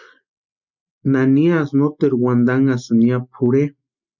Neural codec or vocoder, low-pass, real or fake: none; 7.2 kHz; real